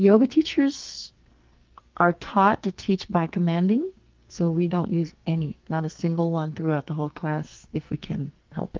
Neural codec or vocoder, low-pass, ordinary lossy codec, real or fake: codec, 44.1 kHz, 2.6 kbps, SNAC; 7.2 kHz; Opus, 32 kbps; fake